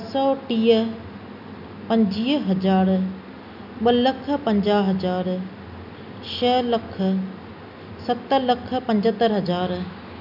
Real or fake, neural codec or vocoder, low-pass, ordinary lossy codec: real; none; 5.4 kHz; none